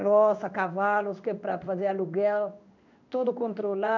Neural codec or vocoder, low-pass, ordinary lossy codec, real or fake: codec, 16 kHz in and 24 kHz out, 1 kbps, XY-Tokenizer; 7.2 kHz; none; fake